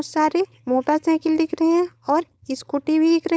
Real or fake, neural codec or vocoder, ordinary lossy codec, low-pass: fake; codec, 16 kHz, 4.8 kbps, FACodec; none; none